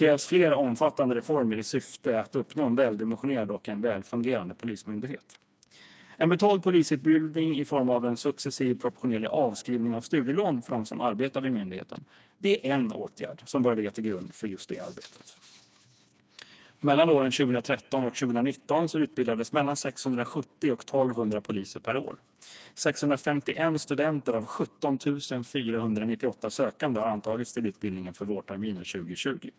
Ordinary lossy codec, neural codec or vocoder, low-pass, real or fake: none; codec, 16 kHz, 2 kbps, FreqCodec, smaller model; none; fake